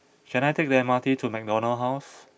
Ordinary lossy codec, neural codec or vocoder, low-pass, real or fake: none; none; none; real